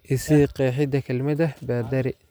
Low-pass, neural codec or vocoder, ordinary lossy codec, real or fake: none; none; none; real